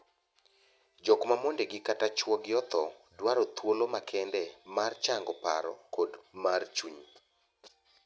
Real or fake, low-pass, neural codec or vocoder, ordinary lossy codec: real; none; none; none